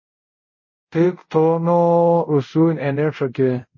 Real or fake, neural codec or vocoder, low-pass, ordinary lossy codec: fake; codec, 24 kHz, 0.5 kbps, DualCodec; 7.2 kHz; MP3, 32 kbps